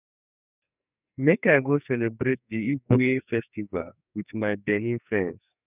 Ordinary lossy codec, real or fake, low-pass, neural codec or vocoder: none; fake; 3.6 kHz; codec, 44.1 kHz, 2.6 kbps, SNAC